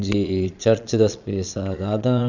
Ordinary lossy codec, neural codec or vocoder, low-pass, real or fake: none; vocoder, 22.05 kHz, 80 mel bands, Vocos; 7.2 kHz; fake